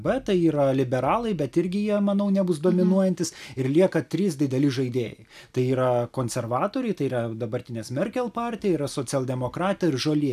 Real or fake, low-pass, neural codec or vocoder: real; 14.4 kHz; none